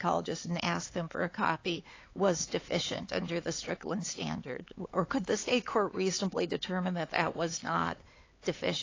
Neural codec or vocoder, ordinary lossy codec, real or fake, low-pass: codec, 16 kHz, 4 kbps, X-Codec, HuBERT features, trained on LibriSpeech; AAC, 32 kbps; fake; 7.2 kHz